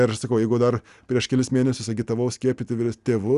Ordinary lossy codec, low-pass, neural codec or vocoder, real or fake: Opus, 64 kbps; 10.8 kHz; none; real